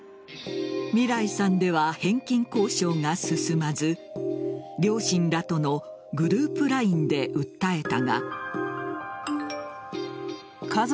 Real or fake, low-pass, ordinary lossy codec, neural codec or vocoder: real; none; none; none